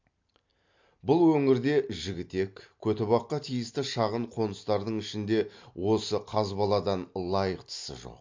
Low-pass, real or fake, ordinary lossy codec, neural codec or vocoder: 7.2 kHz; real; MP3, 48 kbps; none